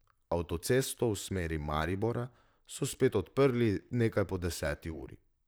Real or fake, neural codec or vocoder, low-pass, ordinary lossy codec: fake; vocoder, 44.1 kHz, 128 mel bands, Pupu-Vocoder; none; none